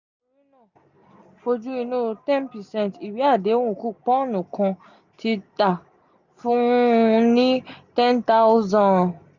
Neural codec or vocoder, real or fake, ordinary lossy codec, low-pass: none; real; none; 7.2 kHz